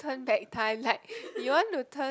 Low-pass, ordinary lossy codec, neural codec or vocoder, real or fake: none; none; none; real